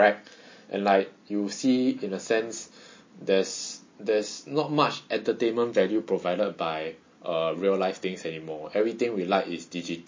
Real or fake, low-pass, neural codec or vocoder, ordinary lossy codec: real; 7.2 kHz; none; MP3, 32 kbps